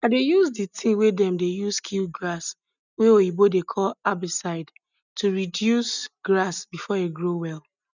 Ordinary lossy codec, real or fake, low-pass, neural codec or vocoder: none; real; 7.2 kHz; none